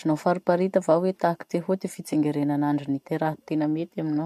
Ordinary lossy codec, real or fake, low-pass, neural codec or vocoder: MP3, 64 kbps; real; 14.4 kHz; none